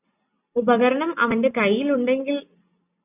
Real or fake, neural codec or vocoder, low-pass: real; none; 3.6 kHz